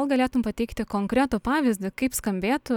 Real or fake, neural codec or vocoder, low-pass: real; none; 19.8 kHz